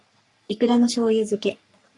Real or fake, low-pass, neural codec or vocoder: fake; 10.8 kHz; codec, 44.1 kHz, 3.4 kbps, Pupu-Codec